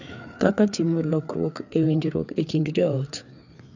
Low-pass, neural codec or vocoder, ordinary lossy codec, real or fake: 7.2 kHz; codec, 16 kHz, 4 kbps, FreqCodec, larger model; none; fake